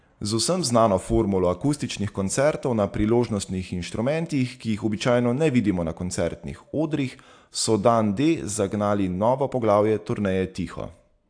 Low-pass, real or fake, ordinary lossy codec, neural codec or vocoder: 9.9 kHz; real; AAC, 64 kbps; none